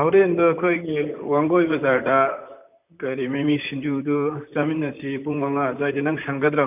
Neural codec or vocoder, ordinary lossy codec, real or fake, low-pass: vocoder, 44.1 kHz, 128 mel bands, Pupu-Vocoder; none; fake; 3.6 kHz